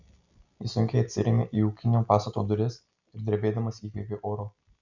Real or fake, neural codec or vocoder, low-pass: real; none; 7.2 kHz